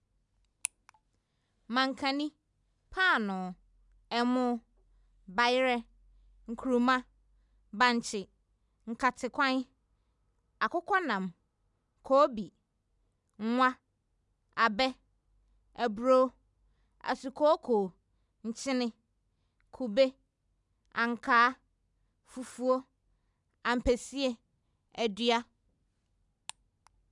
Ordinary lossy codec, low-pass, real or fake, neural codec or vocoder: none; 10.8 kHz; real; none